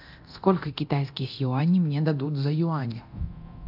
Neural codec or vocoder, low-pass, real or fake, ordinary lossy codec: codec, 24 kHz, 0.9 kbps, DualCodec; 5.4 kHz; fake; AAC, 48 kbps